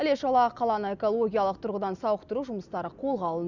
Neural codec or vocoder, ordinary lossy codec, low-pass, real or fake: none; none; 7.2 kHz; real